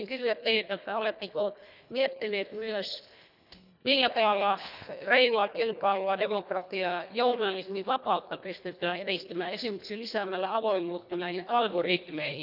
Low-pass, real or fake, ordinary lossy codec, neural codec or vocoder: 5.4 kHz; fake; none; codec, 24 kHz, 1.5 kbps, HILCodec